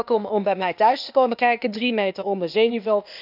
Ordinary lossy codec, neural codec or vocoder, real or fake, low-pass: none; codec, 16 kHz, 0.8 kbps, ZipCodec; fake; 5.4 kHz